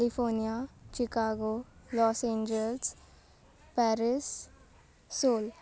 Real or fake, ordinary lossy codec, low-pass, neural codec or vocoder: real; none; none; none